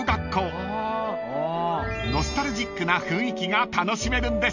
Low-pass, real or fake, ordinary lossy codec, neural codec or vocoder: 7.2 kHz; real; none; none